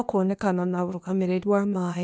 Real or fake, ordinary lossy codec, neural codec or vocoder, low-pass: fake; none; codec, 16 kHz, 0.8 kbps, ZipCodec; none